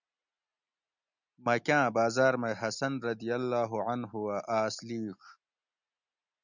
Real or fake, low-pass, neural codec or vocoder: real; 7.2 kHz; none